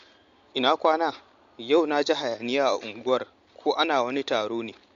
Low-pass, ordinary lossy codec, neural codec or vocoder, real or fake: 7.2 kHz; MP3, 48 kbps; none; real